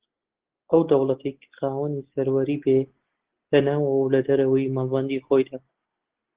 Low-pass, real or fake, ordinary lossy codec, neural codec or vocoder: 3.6 kHz; real; Opus, 16 kbps; none